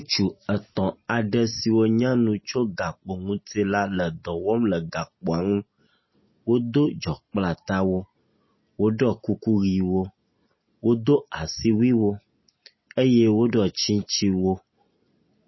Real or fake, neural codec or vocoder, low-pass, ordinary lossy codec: real; none; 7.2 kHz; MP3, 24 kbps